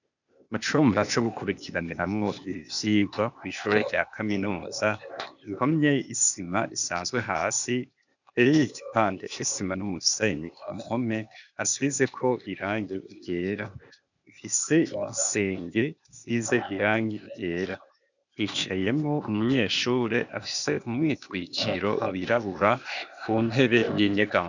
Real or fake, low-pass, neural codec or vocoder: fake; 7.2 kHz; codec, 16 kHz, 0.8 kbps, ZipCodec